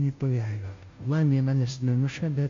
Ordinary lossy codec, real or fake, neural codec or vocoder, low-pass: MP3, 48 kbps; fake; codec, 16 kHz, 0.5 kbps, FunCodec, trained on Chinese and English, 25 frames a second; 7.2 kHz